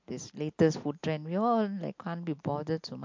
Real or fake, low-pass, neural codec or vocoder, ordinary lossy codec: real; 7.2 kHz; none; MP3, 48 kbps